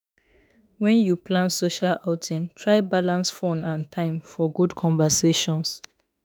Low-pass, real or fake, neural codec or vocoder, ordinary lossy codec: none; fake; autoencoder, 48 kHz, 32 numbers a frame, DAC-VAE, trained on Japanese speech; none